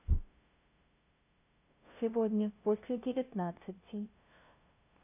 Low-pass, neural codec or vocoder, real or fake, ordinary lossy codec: 3.6 kHz; codec, 16 kHz, 0.5 kbps, FunCodec, trained on LibriTTS, 25 frames a second; fake; none